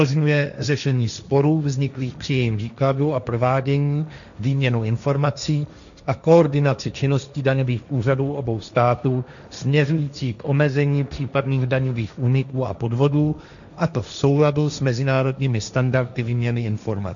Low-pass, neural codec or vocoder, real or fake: 7.2 kHz; codec, 16 kHz, 1.1 kbps, Voila-Tokenizer; fake